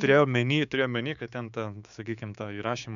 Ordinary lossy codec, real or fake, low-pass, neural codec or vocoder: MP3, 96 kbps; fake; 7.2 kHz; codec, 16 kHz, 6 kbps, DAC